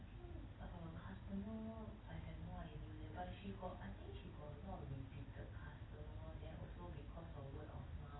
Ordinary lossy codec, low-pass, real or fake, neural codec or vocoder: AAC, 16 kbps; 7.2 kHz; real; none